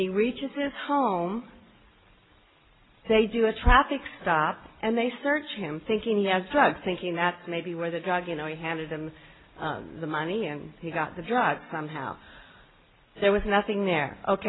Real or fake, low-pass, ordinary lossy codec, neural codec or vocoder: real; 7.2 kHz; AAC, 16 kbps; none